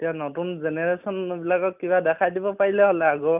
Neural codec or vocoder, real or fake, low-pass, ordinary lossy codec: none; real; 3.6 kHz; none